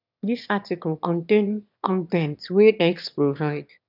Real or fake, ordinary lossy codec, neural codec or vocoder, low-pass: fake; none; autoencoder, 22.05 kHz, a latent of 192 numbers a frame, VITS, trained on one speaker; 5.4 kHz